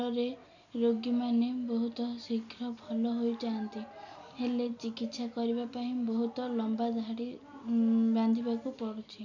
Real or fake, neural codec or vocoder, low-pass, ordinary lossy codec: real; none; 7.2 kHz; none